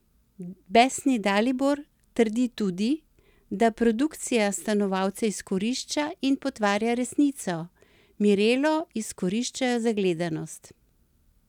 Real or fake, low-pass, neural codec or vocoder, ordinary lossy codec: real; 19.8 kHz; none; none